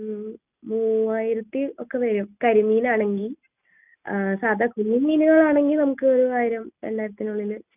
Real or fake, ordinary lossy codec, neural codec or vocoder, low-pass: real; none; none; 3.6 kHz